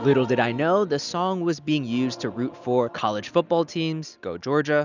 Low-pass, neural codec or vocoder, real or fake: 7.2 kHz; none; real